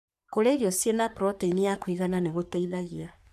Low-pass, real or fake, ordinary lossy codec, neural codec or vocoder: 14.4 kHz; fake; none; codec, 44.1 kHz, 3.4 kbps, Pupu-Codec